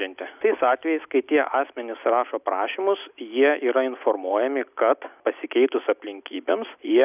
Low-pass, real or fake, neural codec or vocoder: 3.6 kHz; real; none